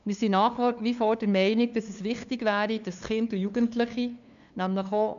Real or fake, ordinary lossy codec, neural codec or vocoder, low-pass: fake; none; codec, 16 kHz, 2 kbps, FunCodec, trained on LibriTTS, 25 frames a second; 7.2 kHz